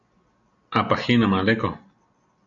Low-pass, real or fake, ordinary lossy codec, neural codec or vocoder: 7.2 kHz; real; MP3, 64 kbps; none